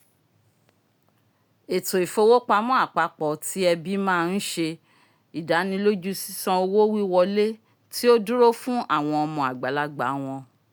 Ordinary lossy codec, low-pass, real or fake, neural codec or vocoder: none; none; real; none